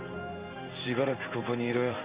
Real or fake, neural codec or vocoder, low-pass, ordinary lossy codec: fake; codec, 16 kHz in and 24 kHz out, 1 kbps, XY-Tokenizer; 3.6 kHz; Opus, 64 kbps